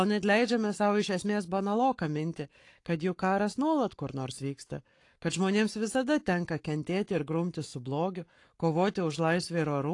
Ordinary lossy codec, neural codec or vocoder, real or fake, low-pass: AAC, 48 kbps; codec, 44.1 kHz, 7.8 kbps, Pupu-Codec; fake; 10.8 kHz